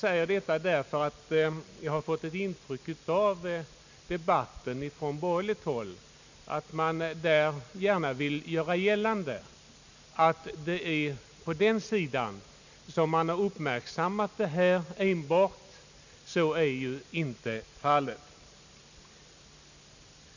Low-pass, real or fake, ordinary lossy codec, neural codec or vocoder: 7.2 kHz; real; none; none